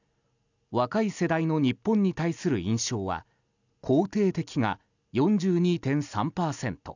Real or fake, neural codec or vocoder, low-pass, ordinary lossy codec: real; none; 7.2 kHz; none